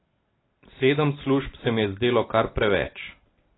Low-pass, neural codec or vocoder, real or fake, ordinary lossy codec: 7.2 kHz; none; real; AAC, 16 kbps